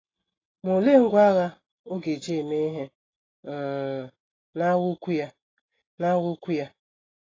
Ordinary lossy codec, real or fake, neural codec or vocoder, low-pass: AAC, 32 kbps; real; none; 7.2 kHz